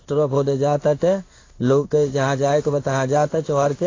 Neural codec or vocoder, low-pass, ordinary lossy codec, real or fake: codec, 16 kHz in and 24 kHz out, 1 kbps, XY-Tokenizer; 7.2 kHz; AAC, 32 kbps; fake